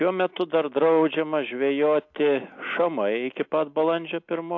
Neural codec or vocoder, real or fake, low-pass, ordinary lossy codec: none; real; 7.2 kHz; AAC, 48 kbps